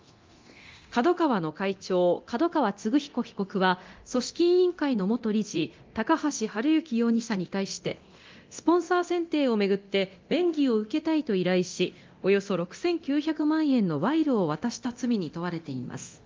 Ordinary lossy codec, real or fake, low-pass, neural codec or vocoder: Opus, 32 kbps; fake; 7.2 kHz; codec, 24 kHz, 0.9 kbps, DualCodec